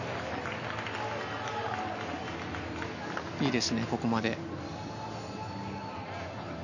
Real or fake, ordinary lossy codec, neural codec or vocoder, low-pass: real; none; none; 7.2 kHz